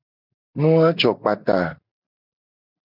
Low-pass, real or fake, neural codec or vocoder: 5.4 kHz; real; none